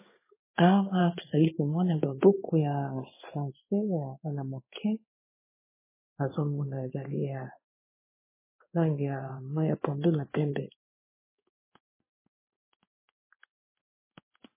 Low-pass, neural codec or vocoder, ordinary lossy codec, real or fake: 3.6 kHz; codec, 16 kHz in and 24 kHz out, 1 kbps, XY-Tokenizer; MP3, 16 kbps; fake